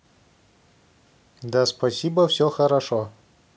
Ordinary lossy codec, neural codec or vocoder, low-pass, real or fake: none; none; none; real